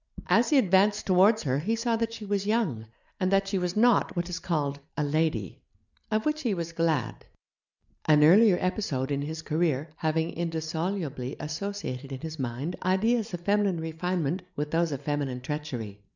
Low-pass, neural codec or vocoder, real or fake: 7.2 kHz; none; real